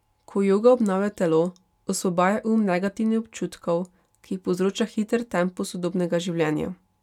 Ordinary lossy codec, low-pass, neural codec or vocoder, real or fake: none; 19.8 kHz; none; real